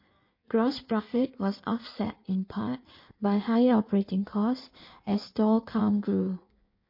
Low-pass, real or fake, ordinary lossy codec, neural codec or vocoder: 5.4 kHz; fake; MP3, 32 kbps; codec, 16 kHz in and 24 kHz out, 1.1 kbps, FireRedTTS-2 codec